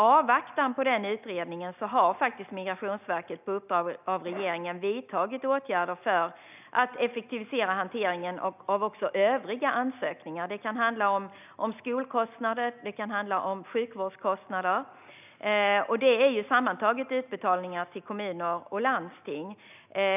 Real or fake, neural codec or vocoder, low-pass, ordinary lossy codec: real; none; 3.6 kHz; none